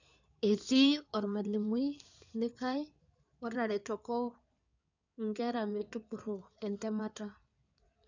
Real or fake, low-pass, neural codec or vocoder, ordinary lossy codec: fake; 7.2 kHz; codec, 16 kHz in and 24 kHz out, 2.2 kbps, FireRedTTS-2 codec; none